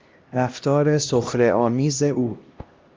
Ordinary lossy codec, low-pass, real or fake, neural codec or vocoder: Opus, 24 kbps; 7.2 kHz; fake; codec, 16 kHz, 1 kbps, X-Codec, HuBERT features, trained on LibriSpeech